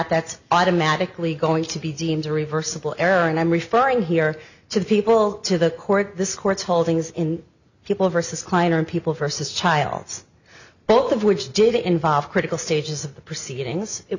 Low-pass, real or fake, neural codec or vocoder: 7.2 kHz; real; none